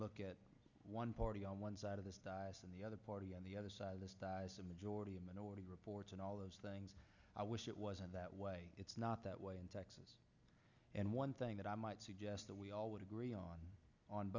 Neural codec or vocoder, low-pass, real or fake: none; 7.2 kHz; real